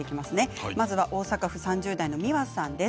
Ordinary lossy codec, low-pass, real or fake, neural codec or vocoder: none; none; real; none